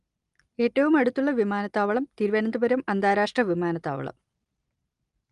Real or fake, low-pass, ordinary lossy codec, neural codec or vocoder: real; 10.8 kHz; Opus, 32 kbps; none